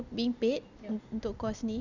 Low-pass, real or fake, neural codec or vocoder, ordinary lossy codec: 7.2 kHz; real; none; none